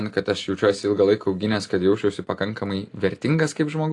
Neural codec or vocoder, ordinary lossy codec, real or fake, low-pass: none; AAC, 48 kbps; real; 10.8 kHz